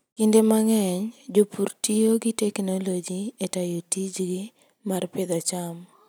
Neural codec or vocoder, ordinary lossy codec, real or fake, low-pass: none; none; real; none